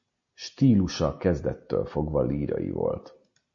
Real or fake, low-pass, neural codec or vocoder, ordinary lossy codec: real; 7.2 kHz; none; MP3, 48 kbps